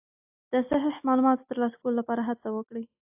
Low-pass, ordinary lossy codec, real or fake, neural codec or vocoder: 3.6 kHz; AAC, 32 kbps; real; none